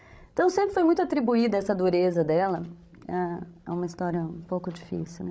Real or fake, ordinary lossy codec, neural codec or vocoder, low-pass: fake; none; codec, 16 kHz, 16 kbps, FreqCodec, larger model; none